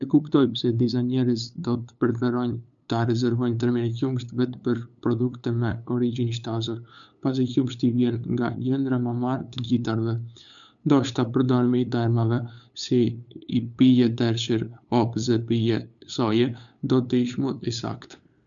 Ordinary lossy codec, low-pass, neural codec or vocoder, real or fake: none; 7.2 kHz; codec, 16 kHz, 8 kbps, FunCodec, trained on LibriTTS, 25 frames a second; fake